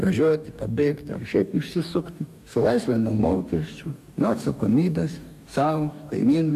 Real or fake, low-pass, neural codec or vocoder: fake; 14.4 kHz; codec, 44.1 kHz, 2.6 kbps, DAC